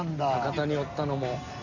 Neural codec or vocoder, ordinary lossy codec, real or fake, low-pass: none; none; real; 7.2 kHz